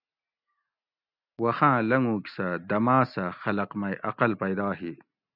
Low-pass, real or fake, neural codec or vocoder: 5.4 kHz; real; none